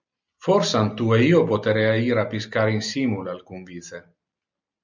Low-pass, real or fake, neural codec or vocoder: 7.2 kHz; real; none